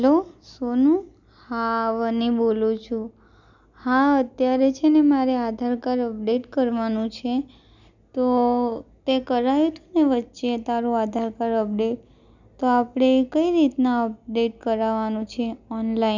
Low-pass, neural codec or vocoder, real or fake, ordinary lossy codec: 7.2 kHz; none; real; none